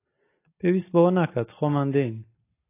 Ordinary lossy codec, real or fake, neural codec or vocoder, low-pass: AAC, 24 kbps; real; none; 3.6 kHz